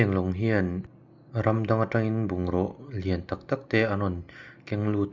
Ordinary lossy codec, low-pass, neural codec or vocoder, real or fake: Opus, 64 kbps; 7.2 kHz; none; real